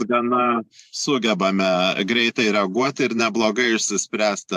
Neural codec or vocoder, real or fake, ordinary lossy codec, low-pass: vocoder, 44.1 kHz, 128 mel bands every 512 samples, BigVGAN v2; fake; AAC, 96 kbps; 14.4 kHz